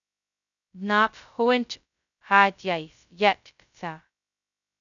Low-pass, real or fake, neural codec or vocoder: 7.2 kHz; fake; codec, 16 kHz, 0.2 kbps, FocalCodec